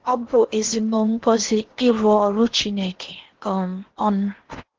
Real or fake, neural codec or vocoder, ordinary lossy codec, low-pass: fake; codec, 16 kHz in and 24 kHz out, 0.8 kbps, FocalCodec, streaming, 65536 codes; Opus, 16 kbps; 7.2 kHz